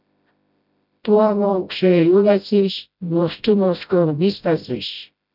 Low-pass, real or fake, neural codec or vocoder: 5.4 kHz; fake; codec, 16 kHz, 0.5 kbps, FreqCodec, smaller model